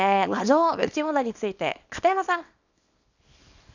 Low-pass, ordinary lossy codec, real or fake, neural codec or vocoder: 7.2 kHz; none; fake; codec, 24 kHz, 0.9 kbps, WavTokenizer, small release